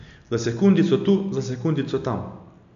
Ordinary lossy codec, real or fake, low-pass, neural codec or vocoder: AAC, 96 kbps; real; 7.2 kHz; none